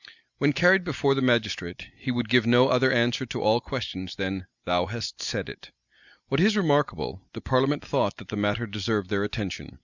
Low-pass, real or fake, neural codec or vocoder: 7.2 kHz; real; none